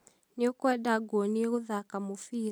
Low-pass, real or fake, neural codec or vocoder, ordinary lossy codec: none; real; none; none